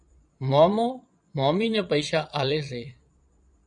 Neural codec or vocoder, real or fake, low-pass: vocoder, 22.05 kHz, 80 mel bands, Vocos; fake; 9.9 kHz